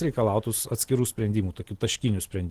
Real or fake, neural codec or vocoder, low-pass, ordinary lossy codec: real; none; 14.4 kHz; Opus, 16 kbps